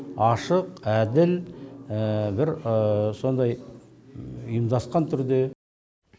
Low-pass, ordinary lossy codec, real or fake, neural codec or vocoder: none; none; real; none